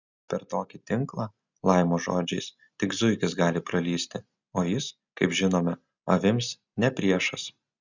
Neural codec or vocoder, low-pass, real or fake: none; 7.2 kHz; real